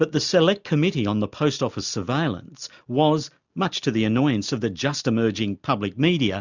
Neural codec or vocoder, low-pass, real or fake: none; 7.2 kHz; real